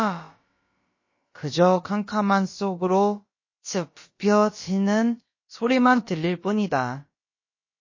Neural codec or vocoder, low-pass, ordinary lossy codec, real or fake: codec, 16 kHz, about 1 kbps, DyCAST, with the encoder's durations; 7.2 kHz; MP3, 32 kbps; fake